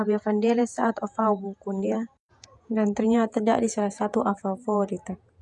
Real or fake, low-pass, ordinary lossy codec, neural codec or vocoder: fake; 10.8 kHz; none; vocoder, 48 kHz, 128 mel bands, Vocos